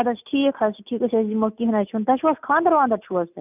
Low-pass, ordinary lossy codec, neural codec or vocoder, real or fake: 3.6 kHz; none; none; real